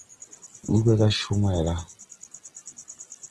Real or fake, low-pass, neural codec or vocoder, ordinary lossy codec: real; 10.8 kHz; none; Opus, 16 kbps